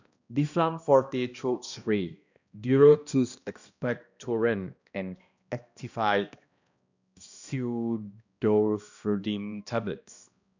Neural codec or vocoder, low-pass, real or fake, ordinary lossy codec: codec, 16 kHz, 1 kbps, X-Codec, HuBERT features, trained on balanced general audio; 7.2 kHz; fake; none